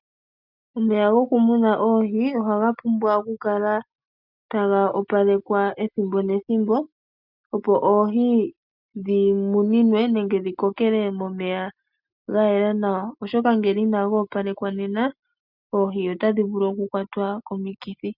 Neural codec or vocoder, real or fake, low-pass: none; real; 5.4 kHz